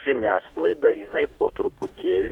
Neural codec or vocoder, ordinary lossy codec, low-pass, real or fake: codec, 44.1 kHz, 2.6 kbps, DAC; MP3, 96 kbps; 19.8 kHz; fake